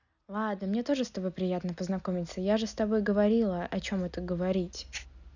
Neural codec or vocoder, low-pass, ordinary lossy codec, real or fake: none; 7.2 kHz; none; real